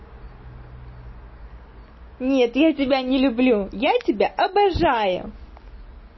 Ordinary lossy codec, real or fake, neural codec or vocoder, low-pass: MP3, 24 kbps; real; none; 7.2 kHz